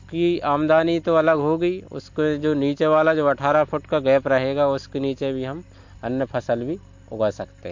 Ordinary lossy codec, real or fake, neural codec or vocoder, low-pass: MP3, 48 kbps; real; none; 7.2 kHz